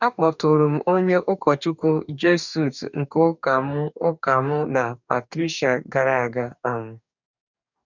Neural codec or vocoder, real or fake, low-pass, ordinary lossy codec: codec, 44.1 kHz, 2.6 kbps, DAC; fake; 7.2 kHz; none